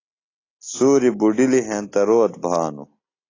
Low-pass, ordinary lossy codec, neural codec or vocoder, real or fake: 7.2 kHz; AAC, 32 kbps; none; real